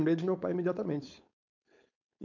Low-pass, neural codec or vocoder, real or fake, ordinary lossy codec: 7.2 kHz; codec, 16 kHz, 4.8 kbps, FACodec; fake; none